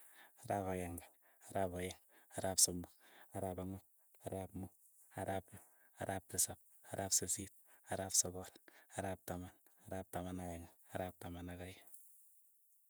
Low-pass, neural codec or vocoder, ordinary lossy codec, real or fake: none; vocoder, 48 kHz, 128 mel bands, Vocos; none; fake